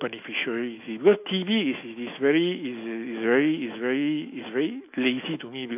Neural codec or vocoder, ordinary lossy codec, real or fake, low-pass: autoencoder, 48 kHz, 128 numbers a frame, DAC-VAE, trained on Japanese speech; AAC, 32 kbps; fake; 3.6 kHz